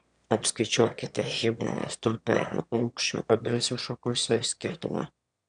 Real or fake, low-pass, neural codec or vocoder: fake; 9.9 kHz; autoencoder, 22.05 kHz, a latent of 192 numbers a frame, VITS, trained on one speaker